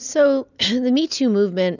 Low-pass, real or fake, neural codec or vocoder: 7.2 kHz; real; none